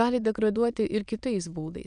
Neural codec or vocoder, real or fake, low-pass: autoencoder, 22.05 kHz, a latent of 192 numbers a frame, VITS, trained on many speakers; fake; 9.9 kHz